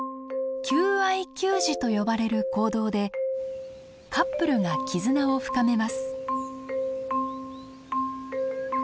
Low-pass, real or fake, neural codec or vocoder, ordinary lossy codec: none; real; none; none